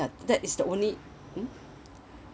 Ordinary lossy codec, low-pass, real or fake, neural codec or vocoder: none; none; real; none